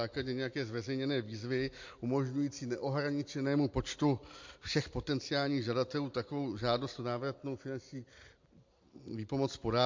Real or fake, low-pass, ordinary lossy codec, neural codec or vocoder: real; 7.2 kHz; MP3, 48 kbps; none